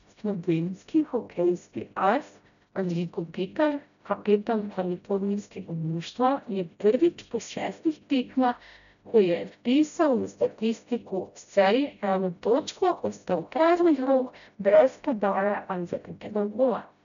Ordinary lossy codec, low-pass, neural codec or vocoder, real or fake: none; 7.2 kHz; codec, 16 kHz, 0.5 kbps, FreqCodec, smaller model; fake